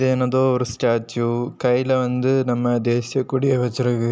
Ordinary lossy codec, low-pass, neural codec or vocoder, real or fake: none; none; none; real